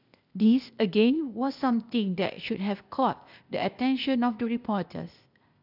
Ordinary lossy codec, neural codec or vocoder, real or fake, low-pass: none; codec, 16 kHz, 0.8 kbps, ZipCodec; fake; 5.4 kHz